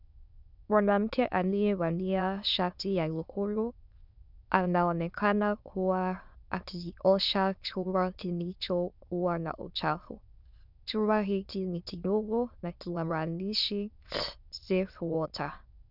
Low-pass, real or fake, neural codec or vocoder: 5.4 kHz; fake; autoencoder, 22.05 kHz, a latent of 192 numbers a frame, VITS, trained on many speakers